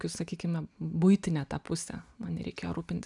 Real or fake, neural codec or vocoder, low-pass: real; none; 10.8 kHz